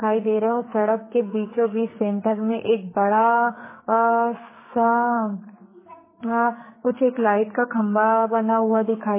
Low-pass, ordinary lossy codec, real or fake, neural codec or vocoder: 3.6 kHz; MP3, 16 kbps; fake; codec, 44.1 kHz, 2.6 kbps, SNAC